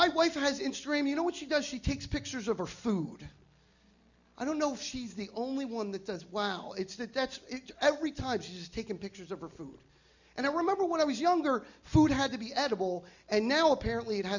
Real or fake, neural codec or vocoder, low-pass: real; none; 7.2 kHz